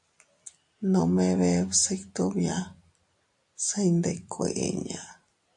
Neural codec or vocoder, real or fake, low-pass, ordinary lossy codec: none; real; 10.8 kHz; AAC, 64 kbps